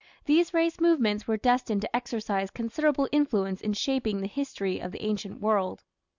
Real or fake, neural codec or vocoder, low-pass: real; none; 7.2 kHz